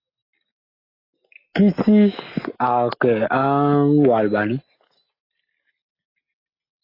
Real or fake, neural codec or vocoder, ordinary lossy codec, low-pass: real; none; AAC, 24 kbps; 5.4 kHz